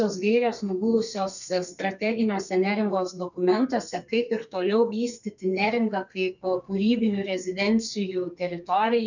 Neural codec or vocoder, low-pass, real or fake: codec, 32 kHz, 1.9 kbps, SNAC; 7.2 kHz; fake